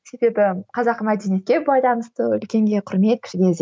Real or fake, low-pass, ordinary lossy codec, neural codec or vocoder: real; none; none; none